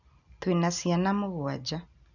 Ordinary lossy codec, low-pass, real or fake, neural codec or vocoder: none; 7.2 kHz; real; none